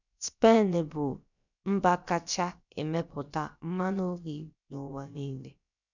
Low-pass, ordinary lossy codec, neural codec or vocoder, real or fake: 7.2 kHz; none; codec, 16 kHz, about 1 kbps, DyCAST, with the encoder's durations; fake